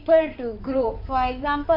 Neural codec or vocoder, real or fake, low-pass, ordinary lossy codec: codec, 16 kHz in and 24 kHz out, 2.2 kbps, FireRedTTS-2 codec; fake; 5.4 kHz; none